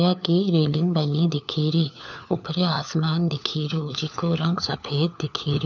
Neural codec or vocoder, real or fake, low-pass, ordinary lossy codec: codec, 16 kHz, 4 kbps, FunCodec, trained on LibriTTS, 50 frames a second; fake; 7.2 kHz; none